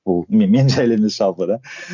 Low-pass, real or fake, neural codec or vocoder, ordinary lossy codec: 7.2 kHz; real; none; none